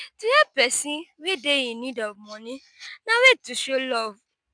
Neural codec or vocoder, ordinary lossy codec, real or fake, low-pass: none; none; real; 9.9 kHz